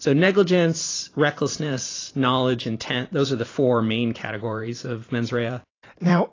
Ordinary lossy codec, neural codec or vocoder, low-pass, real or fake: AAC, 32 kbps; vocoder, 44.1 kHz, 128 mel bands every 512 samples, BigVGAN v2; 7.2 kHz; fake